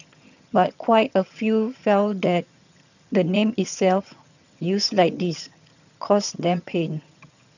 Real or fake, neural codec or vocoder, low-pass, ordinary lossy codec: fake; vocoder, 22.05 kHz, 80 mel bands, HiFi-GAN; 7.2 kHz; none